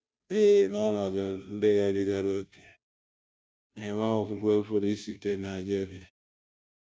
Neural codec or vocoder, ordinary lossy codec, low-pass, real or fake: codec, 16 kHz, 0.5 kbps, FunCodec, trained on Chinese and English, 25 frames a second; none; none; fake